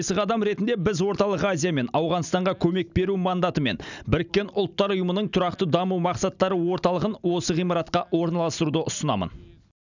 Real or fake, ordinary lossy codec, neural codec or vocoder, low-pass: real; none; none; 7.2 kHz